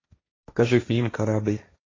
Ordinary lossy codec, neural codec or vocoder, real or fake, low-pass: AAC, 32 kbps; codec, 16 kHz, 1.1 kbps, Voila-Tokenizer; fake; 7.2 kHz